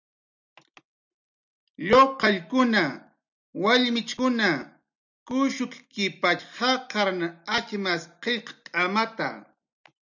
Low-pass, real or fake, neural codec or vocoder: 7.2 kHz; real; none